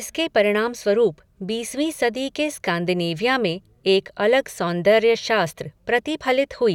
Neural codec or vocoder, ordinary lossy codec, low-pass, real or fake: none; none; 19.8 kHz; real